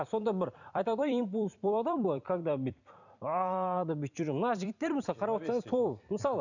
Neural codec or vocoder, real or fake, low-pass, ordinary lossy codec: vocoder, 44.1 kHz, 128 mel bands every 256 samples, BigVGAN v2; fake; 7.2 kHz; none